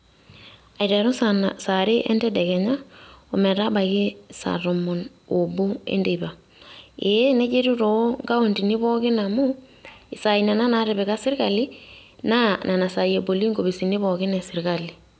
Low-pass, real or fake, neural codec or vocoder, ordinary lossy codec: none; real; none; none